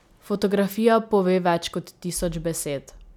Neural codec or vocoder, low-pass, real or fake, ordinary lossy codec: none; 19.8 kHz; real; none